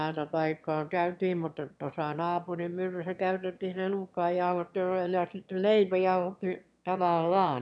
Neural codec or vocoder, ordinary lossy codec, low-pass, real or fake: autoencoder, 22.05 kHz, a latent of 192 numbers a frame, VITS, trained on one speaker; none; none; fake